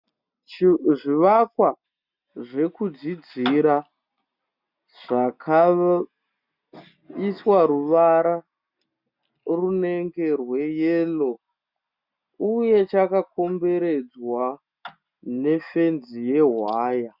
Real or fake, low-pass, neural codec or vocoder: real; 5.4 kHz; none